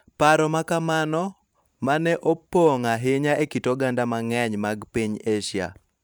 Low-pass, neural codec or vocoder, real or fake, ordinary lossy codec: none; vocoder, 44.1 kHz, 128 mel bands every 512 samples, BigVGAN v2; fake; none